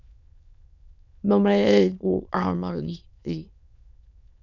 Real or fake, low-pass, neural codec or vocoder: fake; 7.2 kHz; autoencoder, 22.05 kHz, a latent of 192 numbers a frame, VITS, trained on many speakers